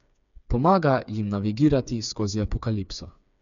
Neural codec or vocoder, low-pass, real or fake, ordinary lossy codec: codec, 16 kHz, 4 kbps, FreqCodec, smaller model; 7.2 kHz; fake; none